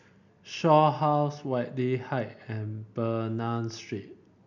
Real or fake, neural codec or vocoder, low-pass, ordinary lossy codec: real; none; 7.2 kHz; none